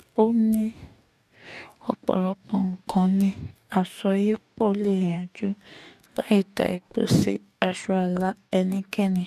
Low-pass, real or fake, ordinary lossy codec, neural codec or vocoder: 14.4 kHz; fake; none; codec, 44.1 kHz, 2.6 kbps, DAC